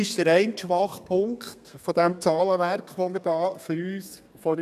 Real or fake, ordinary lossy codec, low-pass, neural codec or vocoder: fake; none; 14.4 kHz; codec, 32 kHz, 1.9 kbps, SNAC